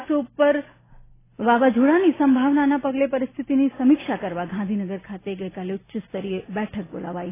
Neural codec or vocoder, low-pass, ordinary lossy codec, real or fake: none; 3.6 kHz; AAC, 16 kbps; real